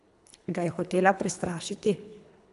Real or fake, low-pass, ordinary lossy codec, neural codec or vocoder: fake; 10.8 kHz; none; codec, 24 kHz, 3 kbps, HILCodec